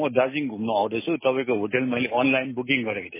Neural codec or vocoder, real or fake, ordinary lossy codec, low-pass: none; real; MP3, 16 kbps; 3.6 kHz